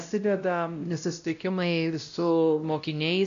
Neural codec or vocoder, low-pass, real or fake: codec, 16 kHz, 0.5 kbps, X-Codec, WavLM features, trained on Multilingual LibriSpeech; 7.2 kHz; fake